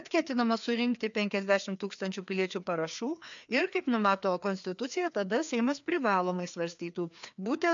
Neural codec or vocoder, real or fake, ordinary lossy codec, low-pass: codec, 16 kHz, 2 kbps, FreqCodec, larger model; fake; MP3, 64 kbps; 7.2 kHz